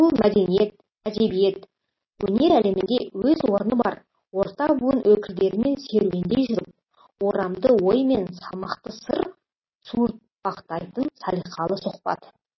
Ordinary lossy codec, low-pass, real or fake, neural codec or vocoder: MP3, 24 kbps; 7.2 kHz; real; none